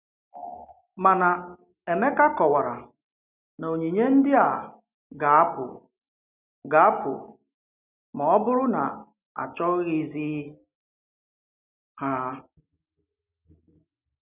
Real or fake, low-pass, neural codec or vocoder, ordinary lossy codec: real; 3.6 kHz; none; none